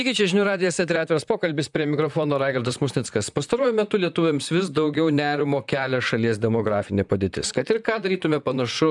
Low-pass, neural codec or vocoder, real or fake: 10.8 kHz; vocoder, 44.1 kHz, 128 mel bands, Pupu-Vocoder; fake